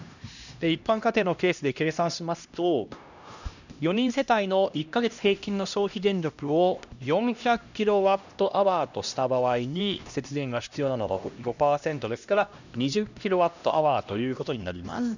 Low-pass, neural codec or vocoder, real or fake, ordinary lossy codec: 7.2 kHz; codec, 16 kHz, 1 kbps, X-Codec, HuBERT features, trained on LibriSpeech; fake; none